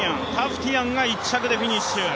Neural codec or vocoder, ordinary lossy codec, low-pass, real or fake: none; none; none; real